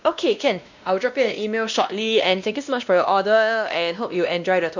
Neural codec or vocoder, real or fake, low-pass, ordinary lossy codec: codec, 16 kHz, 1 kbps, X-Codec, WavLM features, trained on Multilingual LibriSpeech; fake; 7.2 kHz; none